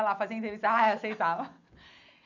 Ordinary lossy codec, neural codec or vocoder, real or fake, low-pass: none; none; real; 7.2 kHz